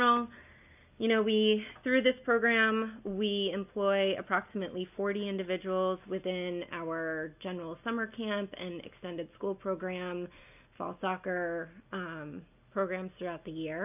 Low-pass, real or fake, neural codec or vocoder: 3.6 kHz; real; none